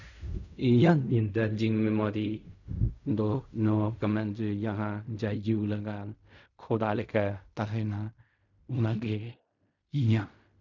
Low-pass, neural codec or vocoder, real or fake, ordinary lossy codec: 7.2 kHz; codec, 16 kHz in and 24 kHz out, 0.4 kbps, LongCat-Audio-Codec, fine tuned four codebook decoder; fake; none